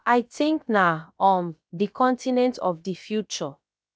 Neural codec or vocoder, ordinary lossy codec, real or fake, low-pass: codec, 16 kHz, about 1 kbps, DyCAST, with the encoder's durations; none; fake; none